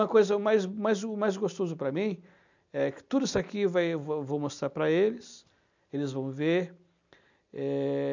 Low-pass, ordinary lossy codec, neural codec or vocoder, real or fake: 7.2 kHz; none; none; real